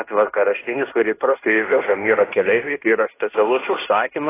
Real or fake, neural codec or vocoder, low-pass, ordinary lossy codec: fake; codec, 16 kHz in and 24 kHz out, 0.9 kbps, LongCat-Audio-Codec, fine tuned four codebook decoder; 3.6 kHz; AAC, 16 kbps